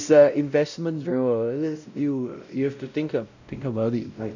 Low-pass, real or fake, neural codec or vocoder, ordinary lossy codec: 7.2 kHz; fake; codec, 16 kHz, 0.5 kbps, X-Codec, WavLM features, trained on Multilingual LibriSpeech; none